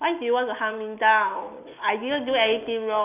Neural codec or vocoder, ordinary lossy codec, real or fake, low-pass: none; none; real; 3.6 kHz